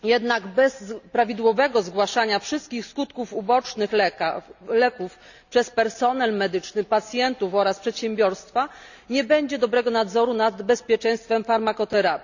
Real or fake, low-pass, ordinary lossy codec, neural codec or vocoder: real; 7.2 kHz; none; none